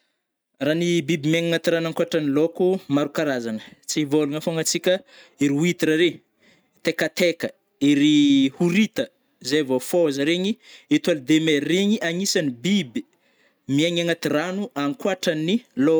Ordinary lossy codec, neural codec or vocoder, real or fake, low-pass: none; none; real; none